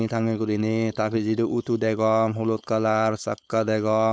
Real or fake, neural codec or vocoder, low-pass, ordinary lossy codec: fake; codec, 16 kHz, 4.8 kbps, FACodec; none; none